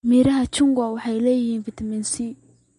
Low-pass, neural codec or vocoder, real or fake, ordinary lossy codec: 10.8 kHz; none; real; MP3, 48 kbps